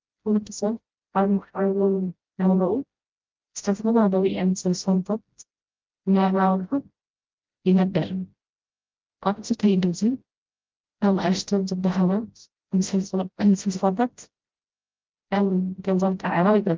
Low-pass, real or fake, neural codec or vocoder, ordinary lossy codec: 7.2 kHz; fake; codec, 16 kHz, 0.5 kbps, FreqCodec, smaller model; Opus, 24 kbps